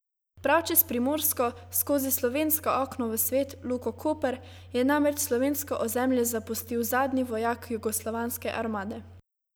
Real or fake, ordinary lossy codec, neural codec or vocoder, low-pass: real; none; none; none